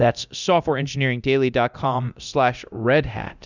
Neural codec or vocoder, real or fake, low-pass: codec, 24 kHz, 0.9 kbps, DualCodec; fake; 7.2 kHz